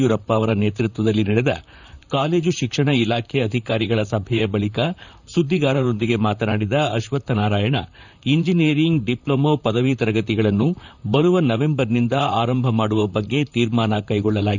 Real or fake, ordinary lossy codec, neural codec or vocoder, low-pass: fake; none; vocoder, 44.1 kHz, 128 mel bands, Pupu-Vocoder; 7.2 kHz